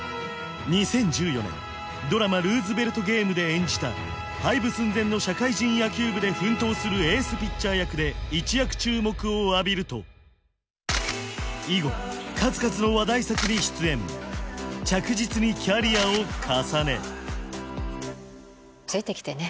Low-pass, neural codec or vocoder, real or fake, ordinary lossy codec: none; none; real; none